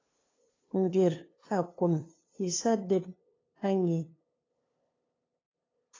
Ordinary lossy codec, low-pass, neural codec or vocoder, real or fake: AAC, 32 kbps; 7.2 kHz; codec, 16 kHz, 2 kbps, FunCodec, trained on LibriTTS, 25 frames a second; fake